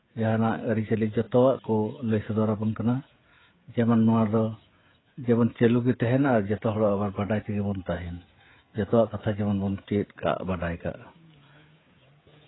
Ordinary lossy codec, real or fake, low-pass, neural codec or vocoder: AAC, 16 kbps; fake; 7.2 kHz; codec, 16 kHz, 16 kbps, FreqCodec, smaller model